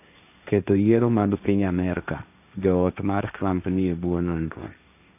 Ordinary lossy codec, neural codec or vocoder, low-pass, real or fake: none; codec, 16 kHz, 1.1 kbps, Voila-Tokenizer; 3.6 kHz; fake